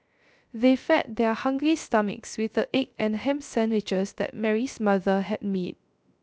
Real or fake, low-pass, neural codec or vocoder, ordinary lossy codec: fake; none; codec, 16 kHz, 0.3 kbps, FocalCodec; none